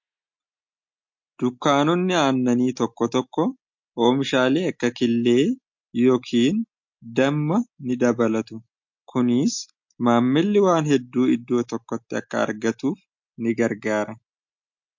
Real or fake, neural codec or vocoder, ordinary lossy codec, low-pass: real; none; MP3, 48 kbps; 7.2 kHz